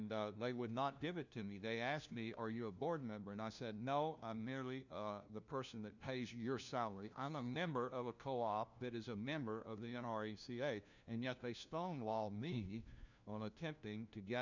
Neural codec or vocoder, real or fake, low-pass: codec, 16 kHz, 1 kbps, FunCodec, trained on LibriTTS, 50 frames a second; fake; 7.2 kHz